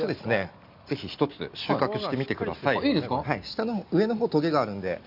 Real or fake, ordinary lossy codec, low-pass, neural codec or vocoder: real; none; 5.4 kHz; none